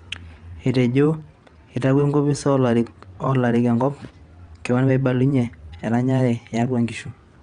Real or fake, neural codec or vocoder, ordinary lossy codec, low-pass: fake; vocoder, 22.05 kHz, 80 mel bands, WaveNeXt; Opus, 64 kbps; 9.9 kHz